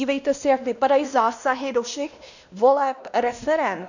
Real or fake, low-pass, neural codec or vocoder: fake; 7.2 kHz; codec, 16 kHz, 1 kbps, X-Codec, WavLM features, trained on Multilingual LibriSpeech